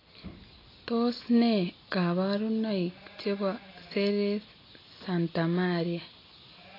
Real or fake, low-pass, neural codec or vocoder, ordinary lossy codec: real; 5.4 kHz; none; AAC, 24 kbps